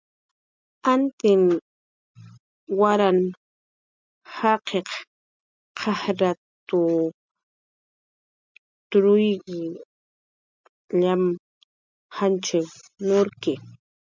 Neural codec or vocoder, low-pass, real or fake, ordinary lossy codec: none; 7.2 kHz; real; MP3, 64 kbps